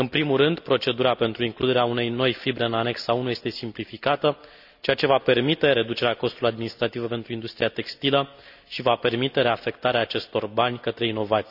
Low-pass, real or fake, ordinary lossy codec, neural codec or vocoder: 5.4 kHz; real; none; none